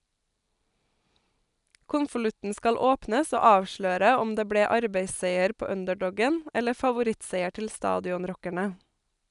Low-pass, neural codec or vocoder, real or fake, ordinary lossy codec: 10.8 kHz; none; real; none